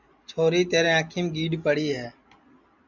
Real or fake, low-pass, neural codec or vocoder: real; 7.2 kHz; none